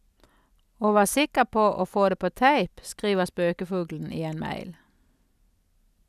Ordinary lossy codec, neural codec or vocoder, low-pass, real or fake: none; none; 14.4 kHz; real